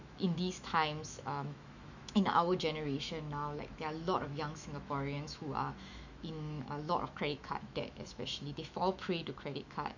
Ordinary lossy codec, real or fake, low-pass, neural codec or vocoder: none; fake; 7.2 kHz; autoencoder, 48 kHz, 128 numbers a frame, DAC-VAE, trained on Japanese speech